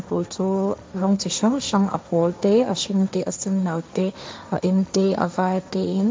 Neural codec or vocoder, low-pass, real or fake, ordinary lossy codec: codec, 16 kHz, 1.1 kbps, Voila-Tokenizer; none; fake; none